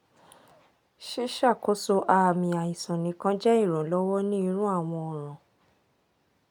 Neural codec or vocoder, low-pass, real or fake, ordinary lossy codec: none; none; real; none